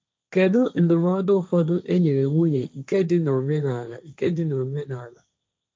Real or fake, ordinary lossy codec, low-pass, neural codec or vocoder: fake; none; none; codec, 16 kHz, 1.1 kbps, Voila-Tokenizer